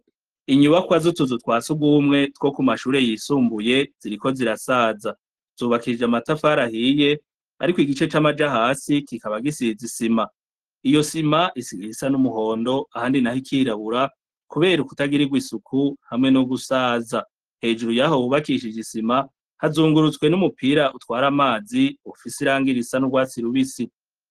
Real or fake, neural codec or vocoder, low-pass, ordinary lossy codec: real; none; 14.4 kHz; Opus, 16 kbps